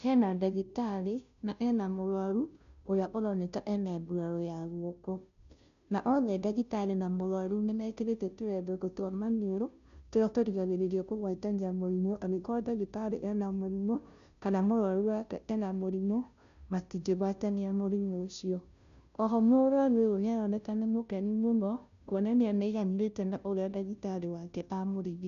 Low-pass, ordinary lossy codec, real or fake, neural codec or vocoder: 7.2 kHz; none; fake; codec, 16 kHz, 0.5 kbps, FunCodec, trained on Chinese and English, 25 frames a second